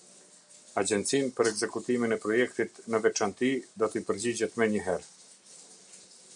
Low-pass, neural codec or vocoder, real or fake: 9.9 kHz; none; real